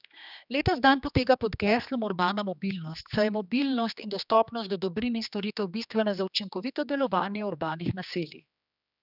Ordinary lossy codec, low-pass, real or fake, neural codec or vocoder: none; 5.4 kHz; fake; codec, 16 kHz, 2 kbps, X-Codec, HuBERT features, trained on general audio